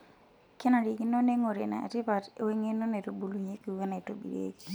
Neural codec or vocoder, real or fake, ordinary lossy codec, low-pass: none; real; none; none